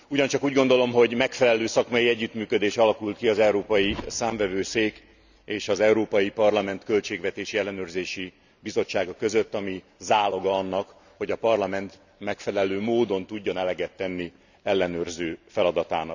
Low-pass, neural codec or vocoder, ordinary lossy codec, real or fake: 7.2 kHz; none; none; real